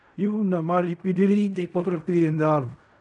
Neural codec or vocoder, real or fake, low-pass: codec, 16 kHz in and 24 kHz out, 0.4 kbps, LongCat-Audio-Codec, fine tuned four codebook decoder; fake; 10.8 kHz